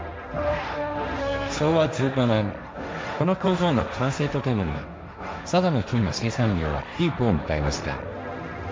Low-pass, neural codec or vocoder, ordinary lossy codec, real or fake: none; codec, 16 kHz, 1.1 kbps, Voila-Tokenizer; none; fake